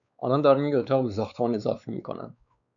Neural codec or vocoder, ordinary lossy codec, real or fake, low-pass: codec, 16 kHz, 4 kbps, X-Codec, WavLM features, trained on Multilingual LibriSpeech; MP3, 96 kbps; fake; 7.2 kHz